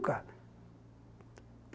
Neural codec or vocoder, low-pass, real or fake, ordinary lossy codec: none; none; real; none